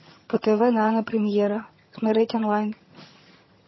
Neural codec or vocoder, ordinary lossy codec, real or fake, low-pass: vocoder, 22.05 kHz, 80 mel bands, HiFi-GAN; MP3, 24 kbps; fake; 7.2 kHz